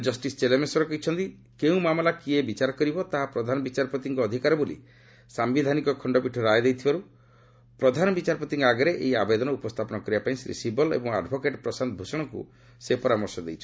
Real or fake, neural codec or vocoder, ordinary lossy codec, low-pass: real; none; none; none